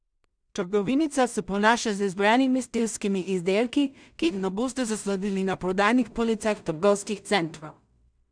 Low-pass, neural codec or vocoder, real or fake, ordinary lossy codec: 9.9 kHz; codec, 16 kHz in and 24 kHz out, 0.4 kbps, LongCat-Audio-Codec, two codebook decoder; fake; none